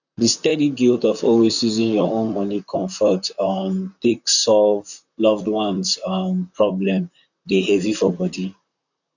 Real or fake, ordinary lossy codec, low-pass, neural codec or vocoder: fake; none; 7.2 kHz; vocoder, 44.1 kHz, 128 mel bands, Pupu-Vocoder